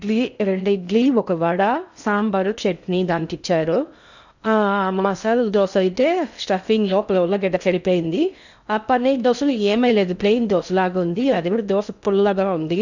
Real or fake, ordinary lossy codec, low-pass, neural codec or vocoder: fake; none; 7.2 kHz; codec, 16 kHz in and 24 kHz out, 0.6 kbps, FocalCodec, streaming, 2048 codes